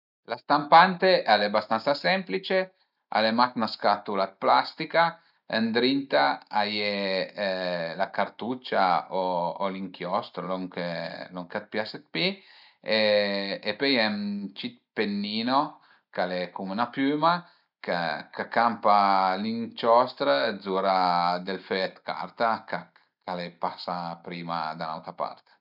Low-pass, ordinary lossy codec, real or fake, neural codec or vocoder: 5.4 kHz; none; real; none